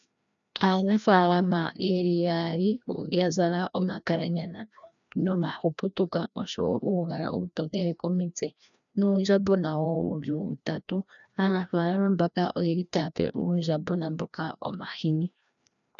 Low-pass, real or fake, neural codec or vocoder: 7.2 kHz; fake; codec, 16 kHz, 1 kbps, FreqCodec, larger model